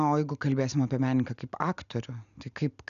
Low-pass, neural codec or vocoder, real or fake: 7.2 kHz; none; real